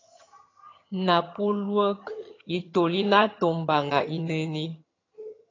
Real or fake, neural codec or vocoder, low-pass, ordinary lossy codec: fake; vocoder, 22.05 kHz, 80 mel bands, HiFi-GAN; 7.2 kHz; AAC, 32 kbps